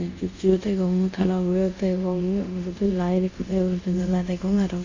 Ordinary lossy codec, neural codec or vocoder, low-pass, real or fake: AAC, 48 kbps; codec, 24 kHz, 0.5 kbps, DualCodec; 7.2 kHz; fake